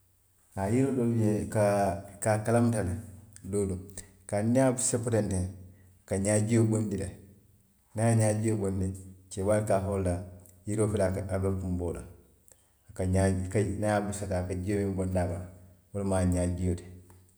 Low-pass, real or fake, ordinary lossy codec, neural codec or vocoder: none; real; none; none